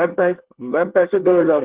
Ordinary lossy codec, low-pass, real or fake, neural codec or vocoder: Opus, 16 kbps; 3.6 kHz; fake; codec, 24 kHz, 1 kbps, SNAC